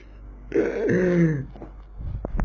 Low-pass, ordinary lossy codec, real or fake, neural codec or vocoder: 7.2 kHz; none; real; none